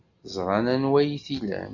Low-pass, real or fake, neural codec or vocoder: 7.2 kHz; real; none